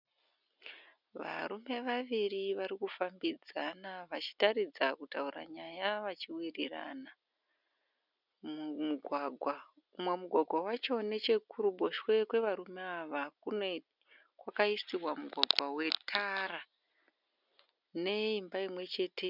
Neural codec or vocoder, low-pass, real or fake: none; 5.4 kHz; real